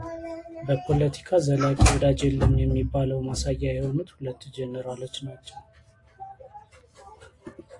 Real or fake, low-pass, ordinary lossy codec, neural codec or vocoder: real; 10.8 kHz; AAC, 48 kbps; none